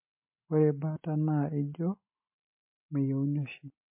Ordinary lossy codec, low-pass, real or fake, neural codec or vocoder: MP3, 24 kbps; 3.6 kHz; real; none